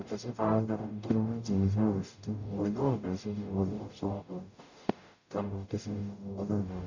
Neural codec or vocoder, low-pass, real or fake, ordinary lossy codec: codec, 44.1 kHz, 0.9 kbps, DAC; 7.2 kHz; fake; none